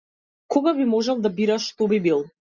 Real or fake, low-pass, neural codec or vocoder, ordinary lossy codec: real; 7.2 kHz; none; Opus, 64 kbps